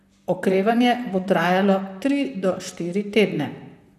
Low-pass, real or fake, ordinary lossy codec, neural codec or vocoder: 14.4 kHz; fake; none; vocoder, 44.1 kHz, 128 mel bands, Pupu-Vocoder